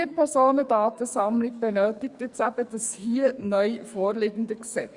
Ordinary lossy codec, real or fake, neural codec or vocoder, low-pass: Opus, 64 kbps; fake; codec, 44.1 kHz, 2.6 kbps, SNAC; 10.8 kHz